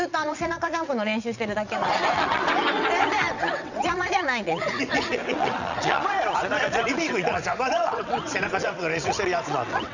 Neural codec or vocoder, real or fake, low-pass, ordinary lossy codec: vocoder, 22.05 kHz, 80 mel bands, WaveNeXt; fake; 7.2 kHz; none